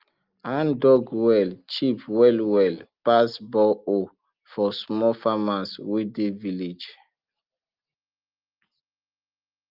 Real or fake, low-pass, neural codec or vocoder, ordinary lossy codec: real; 5.4 kHz; none; Opus, 32 kbps